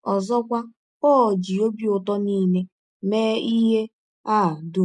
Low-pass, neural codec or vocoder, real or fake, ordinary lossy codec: 10.8 kHz; none; real; none